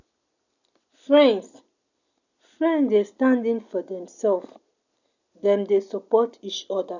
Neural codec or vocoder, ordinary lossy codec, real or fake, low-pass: none; none; real; 7.2 kHz